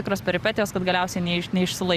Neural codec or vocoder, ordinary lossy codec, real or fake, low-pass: vocoder, 44.1 kHz, 128 mel bands every 512 samples, BigVGAN v2; Opus, 64 kbps; fake; 14.4 kHz